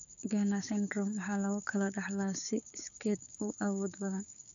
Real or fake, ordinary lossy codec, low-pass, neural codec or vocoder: fake; none; 7.2 kHz; codec, 16 kHz, 6 kbps, DAC